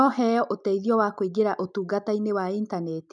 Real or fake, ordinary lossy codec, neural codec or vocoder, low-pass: real; none; none; 10.8 kHz